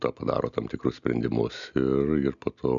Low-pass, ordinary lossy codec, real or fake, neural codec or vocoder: 7.2 kHz; MP3, 96 kbps; real; none